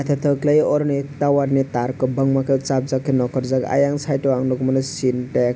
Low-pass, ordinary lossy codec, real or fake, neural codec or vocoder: none; none; real; none